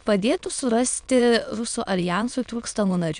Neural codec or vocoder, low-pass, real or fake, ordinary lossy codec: autoencoder, 22.05 kHz, a latent of 192 numbers a frame, VITS, trained on many speakers; 9.9 kHz; fake; Opus, 64 kbps